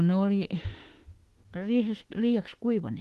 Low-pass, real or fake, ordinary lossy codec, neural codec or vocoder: 14.4 kHz; fake; Opus, 16 kbps; autoencoder, 48 kHz, 32 numbers a frame, DAC-VAE, trained on Japanese speech